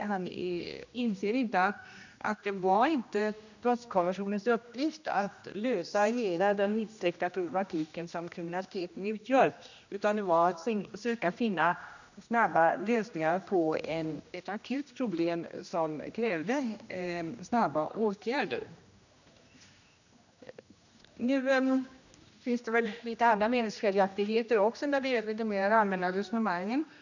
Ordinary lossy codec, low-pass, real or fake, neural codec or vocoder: none; 7.2 kHz; fake; codec, 16 kHz, 1 kbps, X-Codec, HuBERT features, trained on general audio